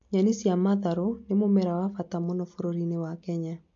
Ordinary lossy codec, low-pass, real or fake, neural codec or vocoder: MP3, 48 kbps; 7.2 kHz; real; none